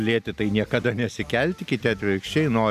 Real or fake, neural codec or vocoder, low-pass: real; none; 14.4 kHz